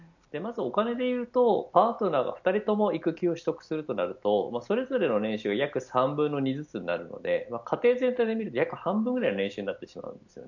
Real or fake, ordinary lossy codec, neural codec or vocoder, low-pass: real; none; none; 7.2 kHz